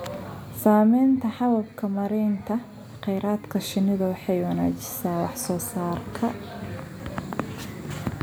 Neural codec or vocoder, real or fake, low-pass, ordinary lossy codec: none; real; none; none